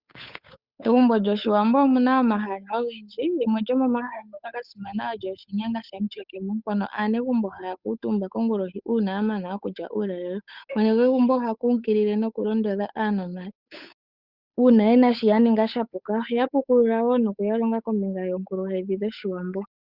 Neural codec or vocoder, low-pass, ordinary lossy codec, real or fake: codec, 16 kHz, 8 kbps, FunCodec, trained on Chinese and English, 25 frames a second; 5.4 kHz; Opus, 64 kbps; fake